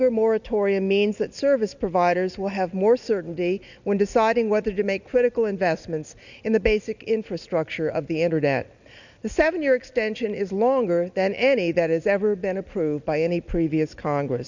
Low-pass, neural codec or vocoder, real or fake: 7.2 kHz; none; real